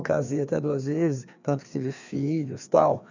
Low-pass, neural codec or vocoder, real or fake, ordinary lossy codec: 7.2 kHz; codec, 16 kHz, 2 kbps, FreqCodec, larger model; fake; none